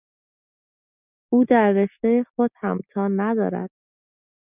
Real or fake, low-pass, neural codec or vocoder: real; 3.6 kHz; none